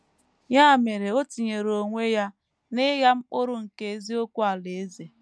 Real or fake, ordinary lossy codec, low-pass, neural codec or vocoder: real; none; none; none